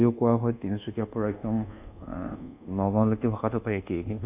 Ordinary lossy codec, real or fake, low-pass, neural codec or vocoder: none; fake; 3.6 kHz; codec, 24 kHz, 1.2 kbps, DualCodec